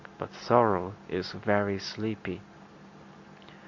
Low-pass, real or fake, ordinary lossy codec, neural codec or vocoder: 7.2 kHz; real; MP3, 48 kbps; none